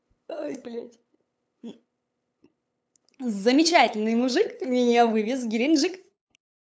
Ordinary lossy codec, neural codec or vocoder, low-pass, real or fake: none; codec, 16 kHz, 8 kbps, FunCodec, trained on LibriTTS, 25 frames a second; none; fake